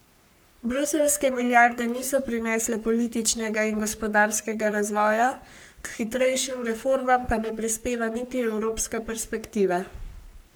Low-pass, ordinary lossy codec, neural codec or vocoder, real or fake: none; none; codec, 44.1 kHz, 3.4 kbps, Pupu-Codec; fake